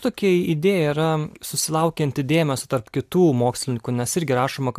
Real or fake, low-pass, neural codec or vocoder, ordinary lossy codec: real; 14.4 kHz; none; AAC, 96 kbps